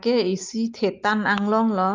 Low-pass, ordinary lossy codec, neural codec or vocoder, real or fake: 7.2 kHz; Opus, 24 kbps; none; real